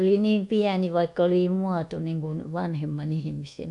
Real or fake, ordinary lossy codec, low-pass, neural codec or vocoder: fake; none; 10.8 kHz; codec, 24 kHz, 1.2 kbps, DualCodec